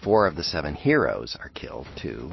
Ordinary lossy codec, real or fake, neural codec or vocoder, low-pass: MP3, 24 kbps; real; none; 7.2 kHz